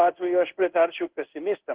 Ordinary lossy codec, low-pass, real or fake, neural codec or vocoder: Opus, 16 kbps; 3.6 kHz; fake; codec, 16 kHz in and 24 kHz out, 1 kbps, XY-Tokenizer